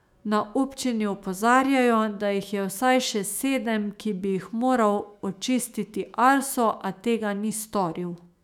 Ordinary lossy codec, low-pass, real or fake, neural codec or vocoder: none; 19.8 kHz; fake; autoencoder, 48 kHz, 128 numbers a frame, DAC-VAE, trained on Japanese speech